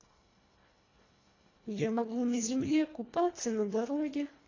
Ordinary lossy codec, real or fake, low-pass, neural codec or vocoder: AAC, 32 kbps; fake; 7.2 kHz; codec, 24 kHz, 1.5 kbps, HILCodec